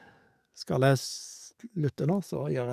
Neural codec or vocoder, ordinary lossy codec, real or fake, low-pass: codec, 44.1 kHz, 7.8 kbps, DAC; none; fake; 14.4 kHz